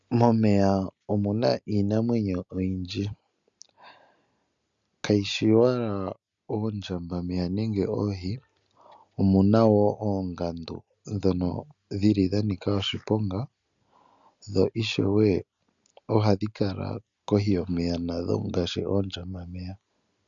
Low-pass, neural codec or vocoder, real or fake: 7.2 kHz; none; real